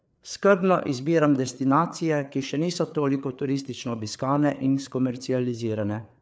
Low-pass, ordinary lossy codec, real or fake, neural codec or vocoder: none; none; fake; codec, 16 kHz, 4 kbps, FreqCodec, larger model